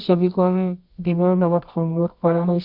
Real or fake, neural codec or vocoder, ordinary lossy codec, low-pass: fake; codec, 24 kHz, 0.9 kbps, WavTokenizer, medium music audio release; Opus, 64 kbps; 5.4 kHz